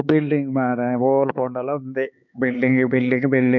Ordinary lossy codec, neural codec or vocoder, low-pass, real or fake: none; codec, 16 kHz, 4 kbps, X-Codec, HuBERT features, trained on LibriSpeech; 7.2 kHz; fake